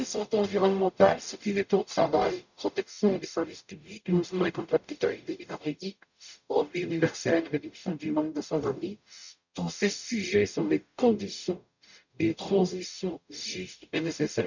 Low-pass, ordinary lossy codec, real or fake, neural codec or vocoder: 7.2 kHz; none; fake; codec, 44.1 kHz, 0.9 kbps, DAC